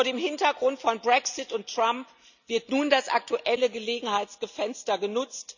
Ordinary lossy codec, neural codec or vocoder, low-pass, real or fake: none; none; 7.2 kHz; real